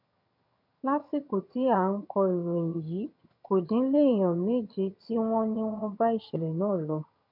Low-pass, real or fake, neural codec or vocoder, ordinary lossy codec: 5.4 kHz; fake; vocoder, 22.05 kHz, 80 mel bands, HiFi-GAN; none